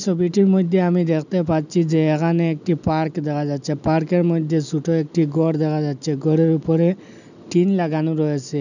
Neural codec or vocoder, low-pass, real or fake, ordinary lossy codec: none; 7.2 kHz; real; none